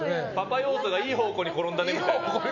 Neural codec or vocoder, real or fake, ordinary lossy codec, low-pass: none; real; none; 7.2 kHz